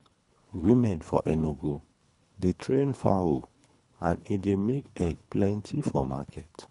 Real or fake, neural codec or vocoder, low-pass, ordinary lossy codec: fake; codec, 24 kHz, 3 kbps, HILCodec; 10.8 kHz; none